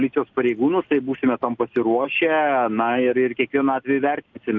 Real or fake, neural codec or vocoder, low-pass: real; none; 7.2 kHz